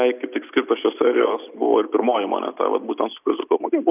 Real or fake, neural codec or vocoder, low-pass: real; none; 3.6 kHz